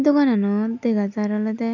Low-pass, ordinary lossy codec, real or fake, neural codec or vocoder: 7.2 kHz; none; real; none